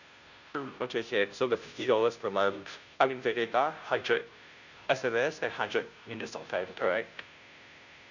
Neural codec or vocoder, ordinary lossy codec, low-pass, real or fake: codec, 16 kHz, 0.5 kbps, FunCodec, trained on Chinese and English, 25 frames a second; none; 7.2 kHz; fake